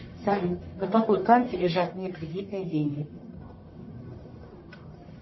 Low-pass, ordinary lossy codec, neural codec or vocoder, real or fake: 7.2 kHz; MP3, 24 kbps; codec, 44.1 kHz, 1.7 kbps, Pupu-Codec; fake